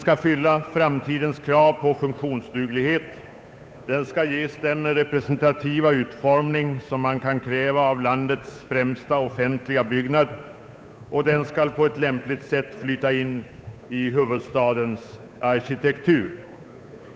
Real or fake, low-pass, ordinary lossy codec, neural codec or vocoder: fake; none; none; codec, 16 kHz, 8 kbps, FunCodec, trained on Chinese and English, 25 frames a second